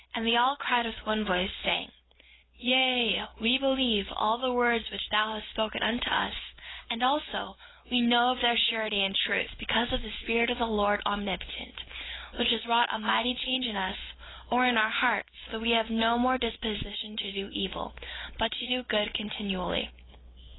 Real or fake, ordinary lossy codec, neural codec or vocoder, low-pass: real; AAC, 16 kbps; none; 7.2 kHz